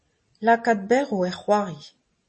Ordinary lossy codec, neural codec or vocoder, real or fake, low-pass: MP3, 32 kbps; none; real; 9.9 kHz